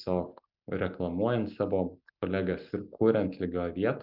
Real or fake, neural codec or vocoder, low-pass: real; none; 5.4 kHz